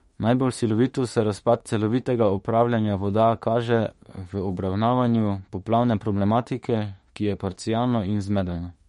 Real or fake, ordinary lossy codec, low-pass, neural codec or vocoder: fake; MP3, 48 kbps; 19.8 kHz; autoencoder, 48 kHz, 32 numbers a frame, DAC-VAE, trained on Japanese speech